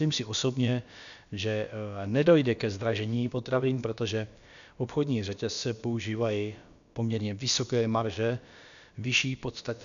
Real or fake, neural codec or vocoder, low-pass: fake; codec, 16 kHz, about 1 kbps, DyCAST, with the encoder's durations; 7.2 kHz